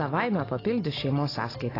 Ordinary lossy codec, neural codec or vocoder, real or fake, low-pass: AAC, 24 kbps; none; real; 5.4 kHz